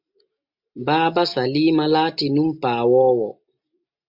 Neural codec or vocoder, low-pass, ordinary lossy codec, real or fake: none; 5.4 kHz; MP3, 48 kbps; real